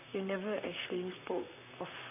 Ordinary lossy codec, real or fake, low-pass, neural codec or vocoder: none; fake; 3.6 kHz; vocoder, 44.1 kHz, 128 mel bands, Pupu-Vocoder